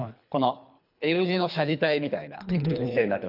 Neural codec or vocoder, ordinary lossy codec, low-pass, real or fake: codec, 24 kHz, 3 kbps, HILCodec; none; 5.4 kHz; fake